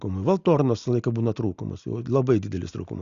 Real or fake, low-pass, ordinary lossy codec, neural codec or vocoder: real; 7.2 kHz; Opus, 64 kbps; none